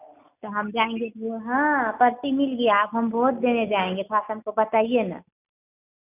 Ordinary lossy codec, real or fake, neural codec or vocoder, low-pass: none; real; none; 3.6 kHz